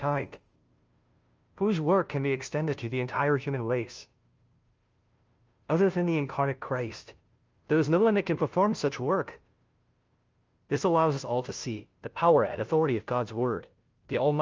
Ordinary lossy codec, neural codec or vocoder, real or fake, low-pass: Opus, 24 kbps; codec, 16 kHz, 0.5 kbps, FunCodec, trained on Chinese and English, 25 frames a second; fake; 7.2 kHz